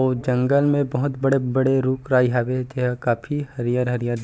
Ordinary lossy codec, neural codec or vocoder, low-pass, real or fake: none; none; none; real